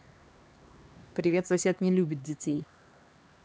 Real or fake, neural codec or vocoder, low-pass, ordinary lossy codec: fake; codec, 16 kHz, 2 kbps, X-Codec, HuBERT features, trained on LibriSpeech; none; none